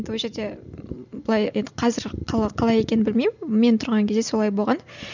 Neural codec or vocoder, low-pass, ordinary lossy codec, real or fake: none; 7.2 kHz; none; real